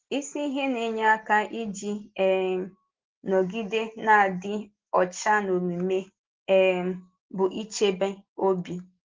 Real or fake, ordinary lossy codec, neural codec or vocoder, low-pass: real; Opus, 16 kbps; none; 7.2 kHz